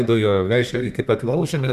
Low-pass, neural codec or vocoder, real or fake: 14.4 kHz; codec, 32 kHz, 1.9 kbps, SNAC; fake